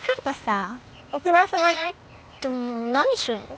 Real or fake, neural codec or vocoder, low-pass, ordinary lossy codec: fake; codec, 16 kHz, 0.8 kbps, ZipCodec; none; none